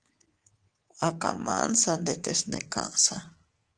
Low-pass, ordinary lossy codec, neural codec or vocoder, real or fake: 9.9 kHz; Opus, 16 kbps; codec, 24 kHz, 3.1 kbps, DualCodec; fake